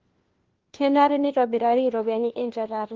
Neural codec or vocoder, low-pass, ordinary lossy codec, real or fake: codec, 16 kHz, 1 kbps, FunCodec, trained on LibriTTS, 50 frames a second; 7.2 kHz; Opus, 16 kbps; fake